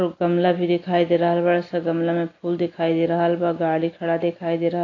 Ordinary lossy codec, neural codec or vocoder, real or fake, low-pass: AAC, 32 kbps; none; real; 7.2 kHz